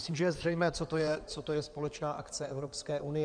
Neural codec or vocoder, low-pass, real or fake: codec, 16 kHz in and 24 kHz out, 2.2 kbps, FireRedTTS-2 codec; 9.9 kHz; fake